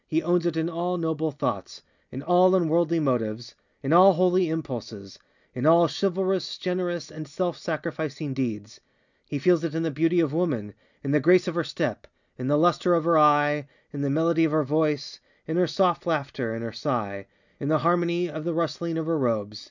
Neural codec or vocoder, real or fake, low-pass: none; real; 7.2 kHz